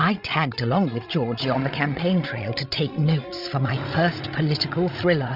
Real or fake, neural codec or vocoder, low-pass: fake; codec, 16 kHz, 16 kbps, FreqCodec, larger model; 5.4 kHz